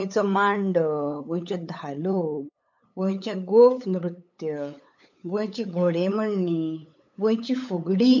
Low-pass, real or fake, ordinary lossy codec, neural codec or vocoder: 7.2 kHz; fake; none; codec, 16 kHz, 8 kbps, FunCodec, trained on LibriTTS, 25 frames a second